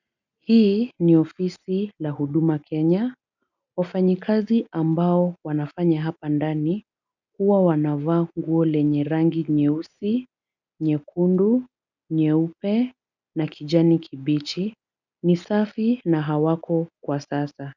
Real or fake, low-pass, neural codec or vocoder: real; 7.2 kHz; none